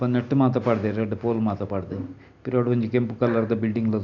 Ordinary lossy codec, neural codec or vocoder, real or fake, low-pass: none; none; real; 7.2 kHz